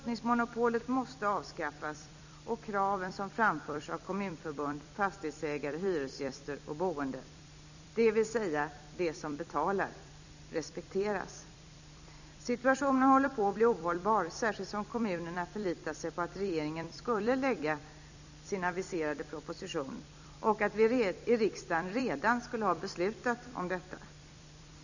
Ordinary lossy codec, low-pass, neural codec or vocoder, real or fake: none; 7.2 kHz; vocoder, 44.1 kHz, 128 mel bands every 256 samples, BigVGAN v2; fake